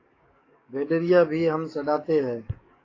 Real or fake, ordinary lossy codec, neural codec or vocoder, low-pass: fake; AAC, 32 kbps; codec, 44.1 kHz, 7.8 kbps, DAC; 7.2 kHz